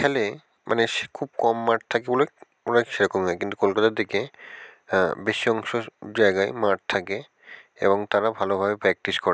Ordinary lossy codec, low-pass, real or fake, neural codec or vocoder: none; none; real; none